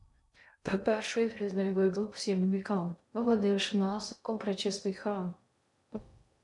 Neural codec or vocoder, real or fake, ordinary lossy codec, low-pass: codec, 16 kHz in and 24 kHz out, 0.6 kbps, FocalCodec, streaming, 2048 codes; fake; MP3, 96 kbps; 10.8 kHz